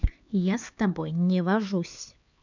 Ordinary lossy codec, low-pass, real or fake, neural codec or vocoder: none; 7.2 kHz; fake; codec, 16 kHz, 4 kbps, X-Codec, HuBERT features, trained on LibriSpeech